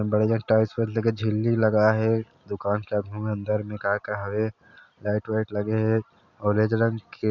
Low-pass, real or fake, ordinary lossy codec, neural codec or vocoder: 7.2 kHz; real; none; none